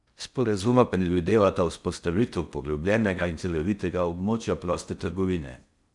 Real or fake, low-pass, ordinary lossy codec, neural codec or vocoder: fake; 10.8 kHz; none; codec, 16 kHz in and 24 kHz out, 0.6 kbps, FocalCodec, streaming, 4096 codes